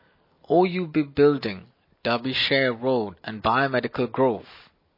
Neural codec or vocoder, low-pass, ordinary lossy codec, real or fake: none; 5.4 kHz; MP3, 24 kbps; real